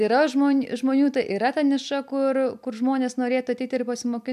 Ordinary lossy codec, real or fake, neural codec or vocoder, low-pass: MP3, 96 kbps; real; none; 14.4 kHz